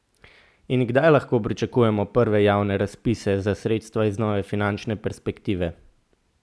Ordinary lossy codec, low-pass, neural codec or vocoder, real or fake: none; none; none; real